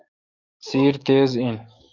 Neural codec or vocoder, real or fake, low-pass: codec, 44.1 kHz, 7.8 kbps, DAC; fake; 7.2 kHz